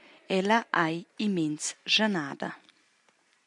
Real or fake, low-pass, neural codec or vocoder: real; 10.8 kHz; none